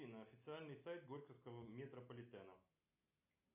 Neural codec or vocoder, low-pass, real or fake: none; 3.6 kHz; real